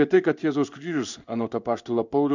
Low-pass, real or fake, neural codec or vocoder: 7.2 kHz; fake; codec, 16 kHz in and 24 kHz out, 1 kbps, XY-Tokenizer